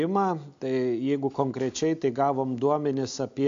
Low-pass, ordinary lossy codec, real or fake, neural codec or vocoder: 7.2 kHz; AAC, 64 kbps; real; none